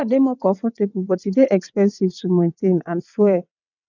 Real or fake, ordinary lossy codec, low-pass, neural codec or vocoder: fake; none; 7.2 kHz; vocoder, 22.05 kHz, 80 mel bands, WaveNeXt